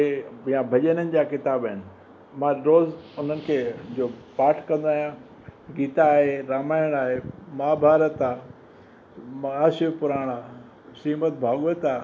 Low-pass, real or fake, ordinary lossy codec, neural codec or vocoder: none; real; none; none